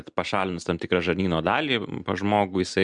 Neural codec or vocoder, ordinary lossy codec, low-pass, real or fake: none; MP3, 96 kbps; 9.9 kHz; real